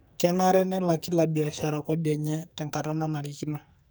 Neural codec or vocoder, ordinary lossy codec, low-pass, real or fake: codec, 44.1 kHz, 2.6 kbps, SNAC; none; none; fake